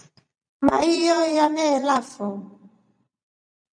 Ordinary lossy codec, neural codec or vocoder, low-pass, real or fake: MP3, 96 kbps; vocoder, 22.05 kHz, 80 mel bands, Vocos; 9.9 kHz; fake